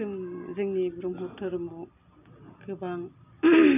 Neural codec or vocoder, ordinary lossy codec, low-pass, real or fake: none; none; 3.6 kHz; real